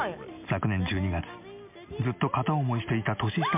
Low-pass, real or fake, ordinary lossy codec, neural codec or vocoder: 3.6 kHz; real; MP3, 32 kbps; none